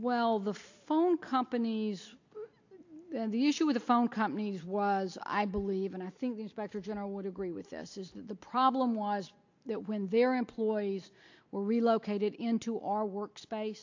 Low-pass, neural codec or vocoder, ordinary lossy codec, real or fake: 7.2 kHz; none; AAC, 48 kbps; real